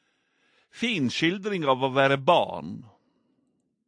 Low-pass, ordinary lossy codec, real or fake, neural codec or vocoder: 9.9 kHz; AAC, 64 kbps; real; none